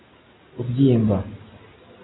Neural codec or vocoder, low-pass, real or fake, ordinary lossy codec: none; 7.2 kHz; real; AAC, 16 kbps